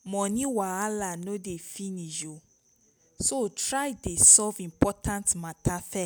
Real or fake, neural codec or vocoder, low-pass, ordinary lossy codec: real; none; none; none